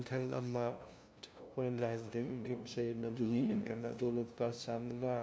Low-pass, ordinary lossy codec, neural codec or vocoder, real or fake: none; none; codec, 16 kHz, 0.5 kbps, FunCodec, trained on LibriTTS, 25 frames a second; fake